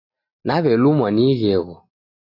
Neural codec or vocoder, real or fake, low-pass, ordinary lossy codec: none; real; 5.4 kHz; AAC, 32 kbps